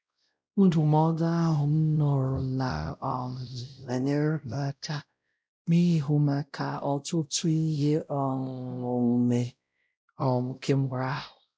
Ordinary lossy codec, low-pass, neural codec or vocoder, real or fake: none; none; codec, 16 kHz, 0.5 kbps, X-Codec, WavLM features, trained on Multilingual LibriSpeech; fake